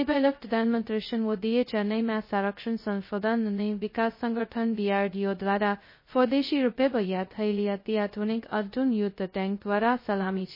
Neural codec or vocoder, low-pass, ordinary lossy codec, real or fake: codec, 16 kHz, 0.2 kbps, FocalCodec; 5.4 kHz; MP3, 24 kbps; fake